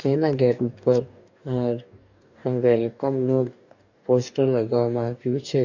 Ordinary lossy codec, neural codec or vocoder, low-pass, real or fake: none; codec, 44.1 kHz, 2.6 kbps, DAC; 7.2 kHz; fake